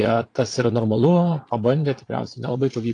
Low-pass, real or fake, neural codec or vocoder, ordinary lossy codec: 9.9 kHz; fake; vocoder, 22.05 kHz, 80 mel bands, Vocos; AAC, 48 kbps